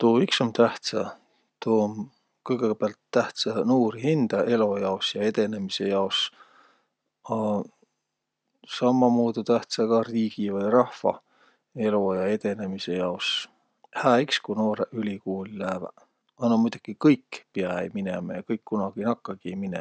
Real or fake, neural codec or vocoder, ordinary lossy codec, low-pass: real; none; none; none